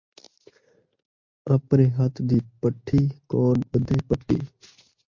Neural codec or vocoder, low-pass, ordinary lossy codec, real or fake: none; 7.2 kHz; MP3, 48 kbps; real